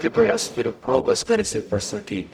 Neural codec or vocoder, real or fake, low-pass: codec, 44.1 kHz, 0.9 kbps, DAC; fake; 19.8 kHz